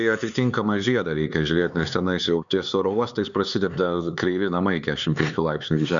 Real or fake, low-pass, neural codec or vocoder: fake; 7.2 kHz; codec, 16 kHz, 4 kbps, X-Codec, HuBERT features, trained on LibriSpeech